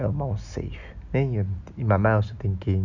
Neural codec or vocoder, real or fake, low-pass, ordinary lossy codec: none; real; 7.2 kHz; none